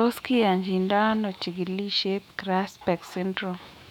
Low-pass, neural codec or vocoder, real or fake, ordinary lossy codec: 19.8 kHz; autoencoder, 48 kHz, 128 numbers a frame, DAC-VAE, trained on Japanese speech; fake; none